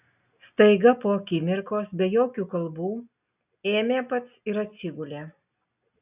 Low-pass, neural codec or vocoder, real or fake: 3.6 kHz; none; real